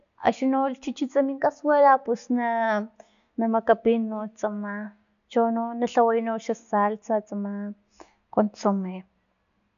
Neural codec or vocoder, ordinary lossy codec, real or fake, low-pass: none; none; real; 7.2 kHz